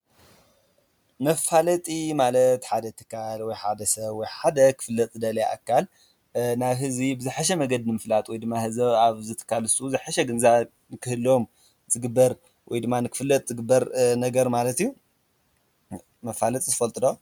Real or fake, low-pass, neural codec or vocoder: real; 19.8 kHz; none